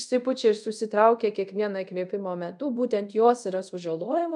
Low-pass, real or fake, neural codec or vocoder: 10.8 kHz; fake; codec, 24 kHz, 0.5 kbps, DualCodec